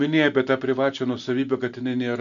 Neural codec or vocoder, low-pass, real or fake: none; 7.2 kHz; real